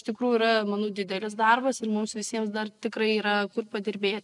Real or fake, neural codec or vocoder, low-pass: real; none; 10.8 kHz